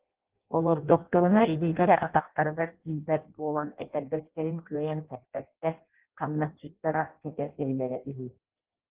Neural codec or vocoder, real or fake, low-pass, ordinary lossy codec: codec, 16 kHz in and 24 kHz out, 0.6 kbps, FireRedTTS-2 codec; fake; 3.6 kHz; Opus, 16 kbps